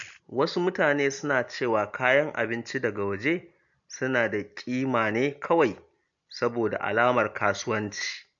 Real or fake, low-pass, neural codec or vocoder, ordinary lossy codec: real; 7.2 kHz; none; AAC, 64 kbps